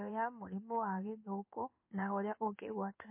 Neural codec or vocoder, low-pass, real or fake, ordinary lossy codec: codec, 16 kHz in and 24 kHz out, 1 kbps, XY-Tokenizer; 3.6 kHz; fake; MP3, 24 kbps